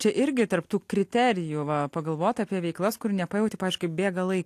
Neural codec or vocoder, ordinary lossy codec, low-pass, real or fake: none; AAC, 64 kbps; 14.4 kHz; real